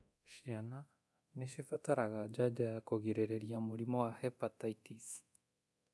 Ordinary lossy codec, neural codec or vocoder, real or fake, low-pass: none; codec, 24 kHz, 0.9 kbps, DualCodec; fake; none